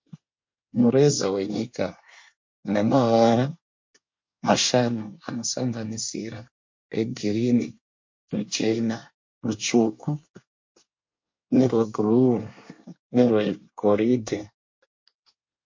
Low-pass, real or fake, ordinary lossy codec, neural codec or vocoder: 7.2 kHz; fake; MP3, 48 kbps; codec, 24 kHz, 1 kbps, SNAC